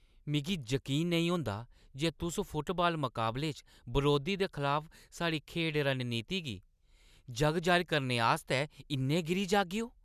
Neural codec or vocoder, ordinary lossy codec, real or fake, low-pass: none; none; real; 14.4 kHz